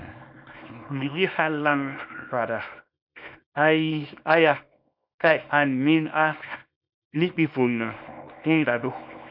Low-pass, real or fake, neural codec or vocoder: 5.4 kHz; fake; codec, 24 kHz, 0.9 kbps, WavTokenizer, small release